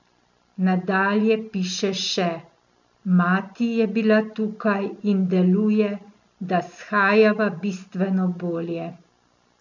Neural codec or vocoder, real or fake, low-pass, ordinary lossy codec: none; real; 7.2 kHz; none